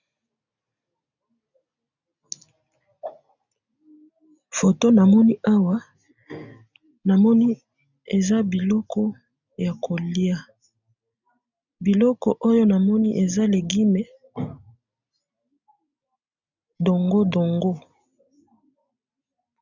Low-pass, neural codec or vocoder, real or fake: 7.2 kHz; none; real